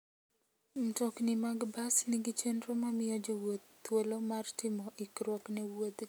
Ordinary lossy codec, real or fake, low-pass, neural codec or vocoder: none; real; none; none